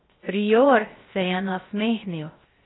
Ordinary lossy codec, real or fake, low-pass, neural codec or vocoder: AAC, 16 kbps; fake; 7.2 kHz; codec, 16 kHz, 0.7 kbps, FocalCodec